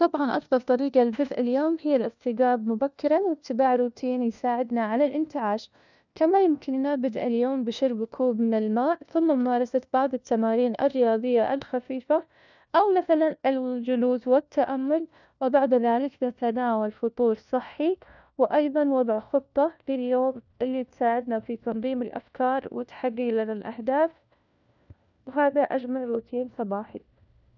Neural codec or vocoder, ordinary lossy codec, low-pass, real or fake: codec, 16 kHz, 1 kbps, FunCodec, trained on LibriTTS, 50 frames a second; none; 7.2 kHz; fake